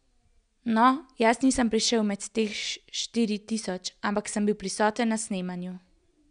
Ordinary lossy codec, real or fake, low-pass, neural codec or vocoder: none; real; 9.9 kHz; none